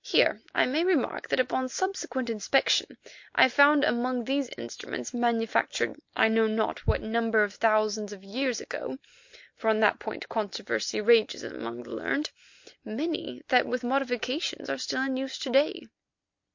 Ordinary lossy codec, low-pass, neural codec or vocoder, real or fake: MP3, 48 kbps; 7.2 kHz; none; real